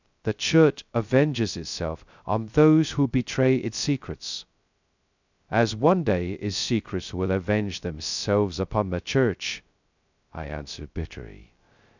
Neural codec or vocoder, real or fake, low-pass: codec, 16 kHz, 0.2 kbps, FocalCodec; fake; 7.2 kHz